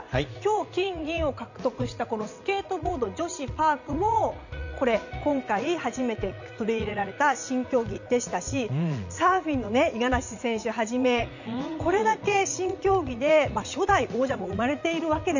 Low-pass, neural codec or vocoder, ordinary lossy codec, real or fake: 7.2 kHz; vocoder, 44.1 kHz, 80 mel bands, Vocos; none; fake